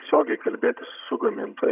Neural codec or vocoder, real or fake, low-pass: vocoder, 22.05 kHz, 80 mel bands, HiFi-GAN; fake; 3.6 kHz